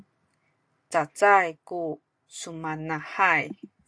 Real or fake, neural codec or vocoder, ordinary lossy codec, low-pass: real; none; AAC, 64 kbps; 9.9 kHz